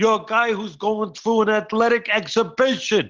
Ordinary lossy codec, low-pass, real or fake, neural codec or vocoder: Opus, 16 kbps; 7.2 kHz; real; none